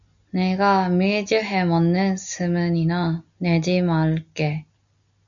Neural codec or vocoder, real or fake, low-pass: none; real; 7.2 kHz